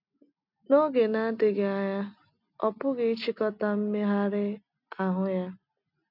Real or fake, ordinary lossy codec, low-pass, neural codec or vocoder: real; none; 5.4 kHz; none